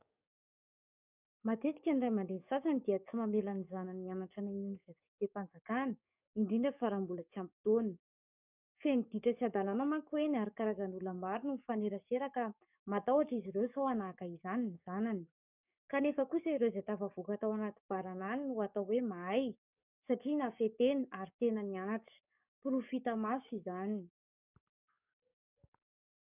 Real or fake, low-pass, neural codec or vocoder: fake; 3.6 kHz; codec, 44.1 kHz, 7.8 kbps, DAC